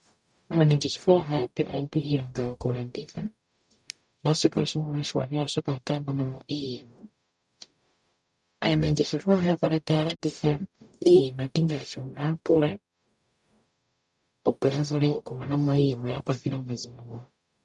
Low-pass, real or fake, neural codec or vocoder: 10.8 kHz; fake; codec, 44.1 kHz, 0.9 kbps, DAC